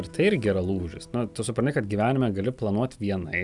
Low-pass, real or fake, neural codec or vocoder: 10.8 kHz; real; none